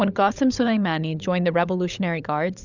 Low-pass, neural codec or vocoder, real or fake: 7.2 kHz; codec, 16 kHz, 16 kbps, FunCodec, trained on LibriTTS, 50 frames a second; fake